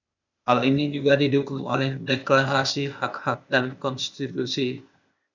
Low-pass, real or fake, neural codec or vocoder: 7.2 kHz; fake; codec, 16 kHz, 0.8 kbps, ZipCodec